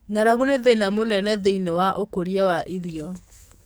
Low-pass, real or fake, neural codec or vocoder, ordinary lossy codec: none; fake; codec, 44.1 kHz, 2.6 kbps, SNAC; none